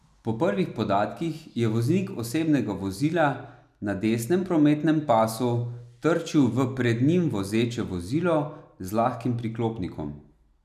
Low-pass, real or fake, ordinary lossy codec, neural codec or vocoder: 14.4 kHz; real; none; none